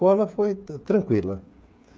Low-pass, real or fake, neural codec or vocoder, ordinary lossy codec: none; fake; codec, 16 kHz, 8 kbps, FreqCodec, smaller model; none